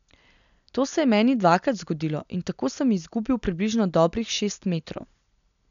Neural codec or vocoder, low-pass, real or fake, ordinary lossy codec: none; 7.2 kHz; real; none